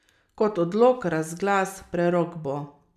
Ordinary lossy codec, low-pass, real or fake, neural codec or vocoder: none; 14.4 kHz; real; none